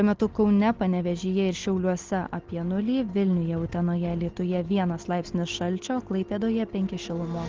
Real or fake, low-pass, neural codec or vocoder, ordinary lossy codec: real; 7.2 kHz; none; Opus, 16 kbps